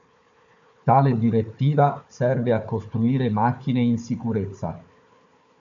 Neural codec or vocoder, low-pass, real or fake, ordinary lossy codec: codec, 16 kHz, 4 kbps, FunCodec, trained on Chinese and English, 50 frames a second; 7.2 kHz; fake; AAC, 64 kbps